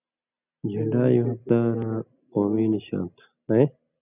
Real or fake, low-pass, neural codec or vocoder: real; 3.6 kHz; none